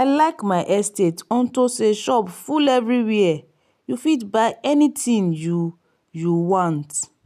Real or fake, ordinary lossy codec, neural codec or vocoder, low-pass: real; none; none; 14.4 kHz